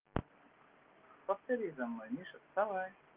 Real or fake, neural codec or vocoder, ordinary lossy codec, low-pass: real; none; Opus, 32 kbps; 3.6 kHz